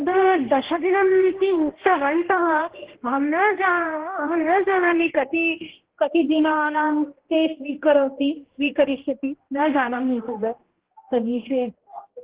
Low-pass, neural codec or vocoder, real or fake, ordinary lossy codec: 3.6 kHz; codec, 16 kHz, 1 kbps, X-Codec, HuBERT features, trained on general audio; fake; Opus, 16 kbps